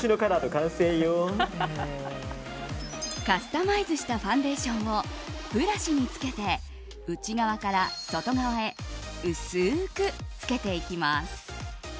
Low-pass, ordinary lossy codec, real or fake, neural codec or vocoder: none; none; real; none